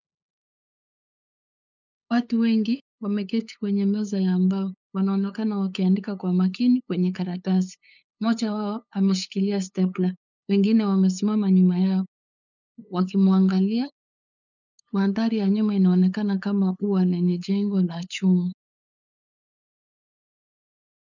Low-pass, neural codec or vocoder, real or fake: 7.2 kHz; codec, 16 kHz, 8 kbps, FunCodec, trained on LibriTTS, 25 frames a second; fake